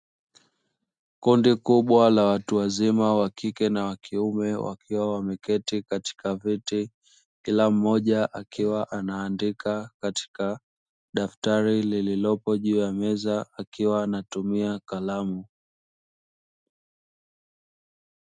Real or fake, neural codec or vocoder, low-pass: real; none; 9.9 kHz